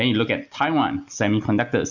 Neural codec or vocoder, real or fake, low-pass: none; real; 7.2 kHz